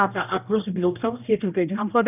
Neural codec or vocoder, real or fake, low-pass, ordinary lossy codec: codec, 16 kHz, 1 kbps, X-Codec, HuBERT features, trained on general audio; fake; 3.6 kHz; none